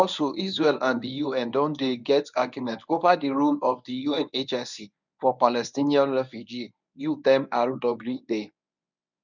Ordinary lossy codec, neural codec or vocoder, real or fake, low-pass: none; codec, 24 kHz, 0.9 kbps, WavTokenizer, medium speech release version 1; fake; 7.2 kHz